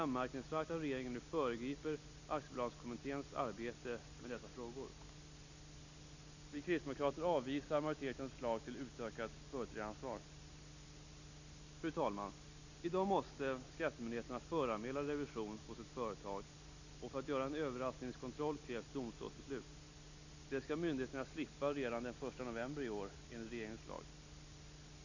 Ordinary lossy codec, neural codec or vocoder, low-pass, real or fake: none; none; 7.2 kHz; real